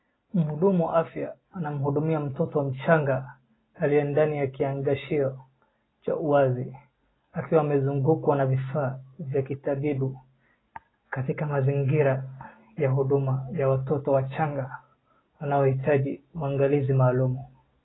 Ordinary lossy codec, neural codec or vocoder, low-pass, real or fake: AAC, 16 kbps; none; 7.2 kHz; real